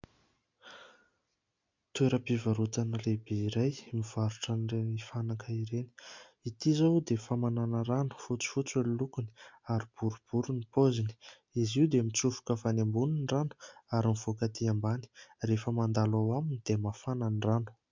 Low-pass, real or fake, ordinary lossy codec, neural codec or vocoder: 7.2 kHz; real; MP3, 64 kbps; none